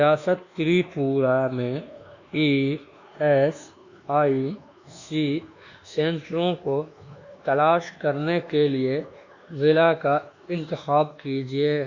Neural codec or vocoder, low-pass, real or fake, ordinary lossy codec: codec, 24 kHz, 1.2 kbps, DualCodec; 7.2 kHz; fake; Opus, 64 kbps